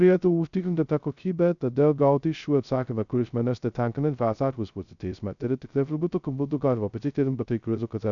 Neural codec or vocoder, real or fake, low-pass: codec, 16 kHz, 0.2 kbps, FocalCodec; fake; 7.2 kHz